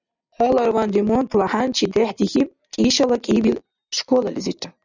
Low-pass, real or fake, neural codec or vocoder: 7.2 kHz; real; none